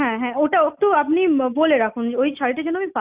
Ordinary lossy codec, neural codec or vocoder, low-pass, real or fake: Opus, 64 kbps; none; 3.6 kHz; real